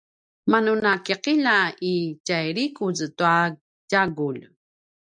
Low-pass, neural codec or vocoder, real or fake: 9.9 kHz; none; real